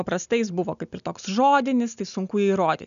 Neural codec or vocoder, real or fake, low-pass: none; real; 7.2 kHz